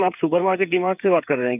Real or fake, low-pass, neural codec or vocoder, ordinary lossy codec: fake; 3.6 kHz; codec, 16 kHz, 8 kbps, FreqCodec, smaller model; none